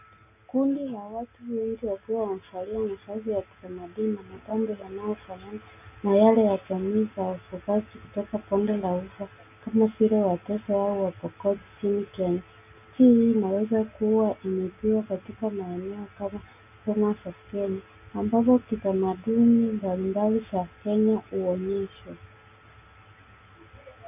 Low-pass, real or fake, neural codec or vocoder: 3.6 kHz; real; none